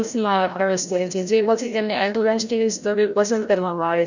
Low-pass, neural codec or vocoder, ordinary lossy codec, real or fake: 7.2 kHz; codec, 16 kHz, 0.5 kbps, FreqCodec, larger model; none; fake